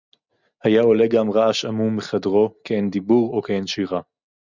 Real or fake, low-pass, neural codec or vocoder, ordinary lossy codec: real; 7.2 kHz; none; Opus, 64 kbps